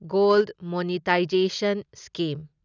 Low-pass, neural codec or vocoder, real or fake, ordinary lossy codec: 7.2 kHz; none; real; none